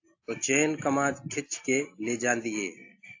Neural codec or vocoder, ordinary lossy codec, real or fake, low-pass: none; AAC, 48 kbps; real; 7.2 kHz